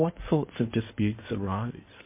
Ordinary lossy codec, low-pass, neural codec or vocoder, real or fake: MP3, 24 kbps; 3.6 kHz; codec, 16 kHz in and 24 kHz out, 2.2 kbps, FireRedTTS-2 codec; fake